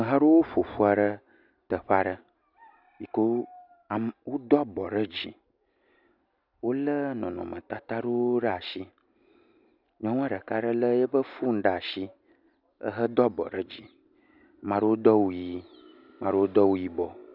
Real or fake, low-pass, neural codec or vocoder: real; 5.4 kHz; none